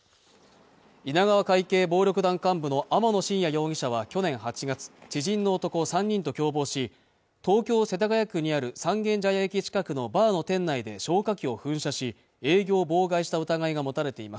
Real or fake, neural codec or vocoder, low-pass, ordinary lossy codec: real; none; none; none